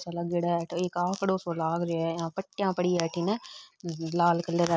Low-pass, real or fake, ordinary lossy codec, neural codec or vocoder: none; real; none; none